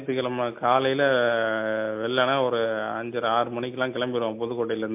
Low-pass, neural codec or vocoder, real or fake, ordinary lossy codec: 3.6 kHz; none; real; MP3, 32 kbps